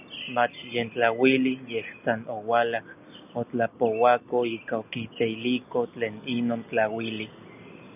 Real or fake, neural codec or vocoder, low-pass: real; none; 3.6 kHz